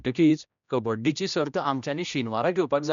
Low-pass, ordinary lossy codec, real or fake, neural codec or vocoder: 7.2 kHz; MP3, 96 kbps; fake; codec, 16 kHz, 1 kbps, X-Codec, HuBERT features, trained on general audio